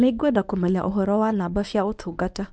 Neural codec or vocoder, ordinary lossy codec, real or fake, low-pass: codec, 24 kHz, 0.9 kbps, WavTokenizer, medium speech release version 1; none; fake; 9.9 kHz